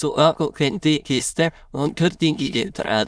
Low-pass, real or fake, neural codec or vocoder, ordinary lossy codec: none; fake; autoencoder, 22.05 kHz, a latent of 192 numbers a frame, VITS, trained on many speakers; none